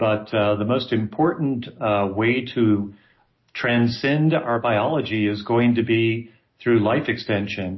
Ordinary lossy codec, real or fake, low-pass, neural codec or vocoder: MP3, 24 kbps; real; 7.2 kHz; none